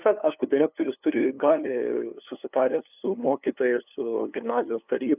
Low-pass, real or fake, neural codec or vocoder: 3.6 kHz; fake; codec, 16 kHz, 2 kbps, FunCodec, trained on LibriTTS, 25 frames a second